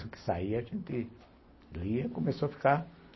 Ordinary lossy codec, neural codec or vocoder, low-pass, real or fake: MP3, 24 kbps; none; 7.2 kHz; real